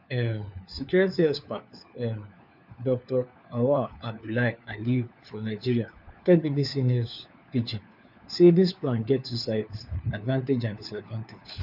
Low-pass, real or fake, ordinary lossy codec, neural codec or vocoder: 5.4 kHz; fake; none; codec, 16 kHz, 4 kbps, FunCodec, trained on LibriTTS, 50 frames a second